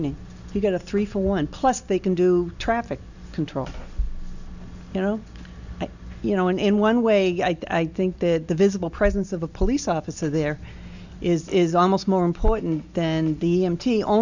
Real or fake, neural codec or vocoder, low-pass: real; none; 7.2 kHz